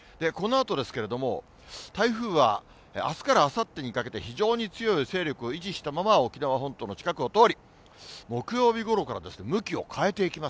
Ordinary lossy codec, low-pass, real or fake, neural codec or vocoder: none; none; real; none